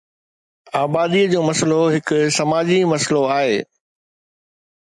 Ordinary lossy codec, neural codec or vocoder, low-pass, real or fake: MP3, 96 kbps; none; 10.8 kHz; real